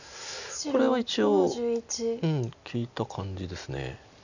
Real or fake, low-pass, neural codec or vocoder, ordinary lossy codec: real; 7.2 kHz; none; none